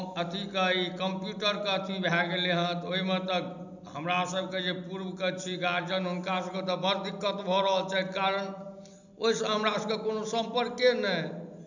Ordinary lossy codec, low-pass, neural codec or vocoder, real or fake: none; 7.2 kHz; none; real